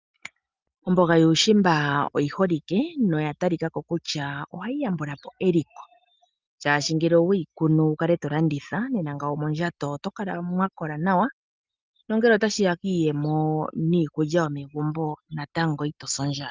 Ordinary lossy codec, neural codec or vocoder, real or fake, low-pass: Opus, 24 kbps; none; real; 7.2 kHz